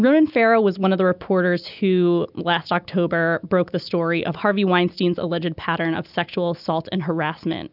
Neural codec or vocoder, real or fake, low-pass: none; real; 5.4 kHz